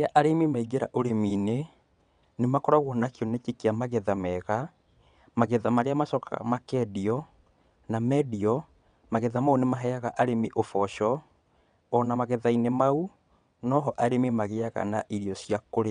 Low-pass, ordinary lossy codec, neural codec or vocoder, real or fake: 9.9 kHz; none; vocoder, 22.05 kHz, 80 mel bands, WaveNeXt; fake